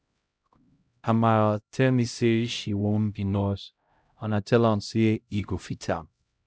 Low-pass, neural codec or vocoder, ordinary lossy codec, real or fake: none; codec, 16 kHz, 0.5 kbps, X-Codec, HuBERT features, trained on LibriSpeech; none; fake